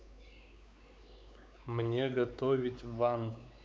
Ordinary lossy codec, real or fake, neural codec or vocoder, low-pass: none; fake; codec, 16 kHz, 4 kbps, X-Codec, WavLM features, trained on Multilingual LibriSpeech; none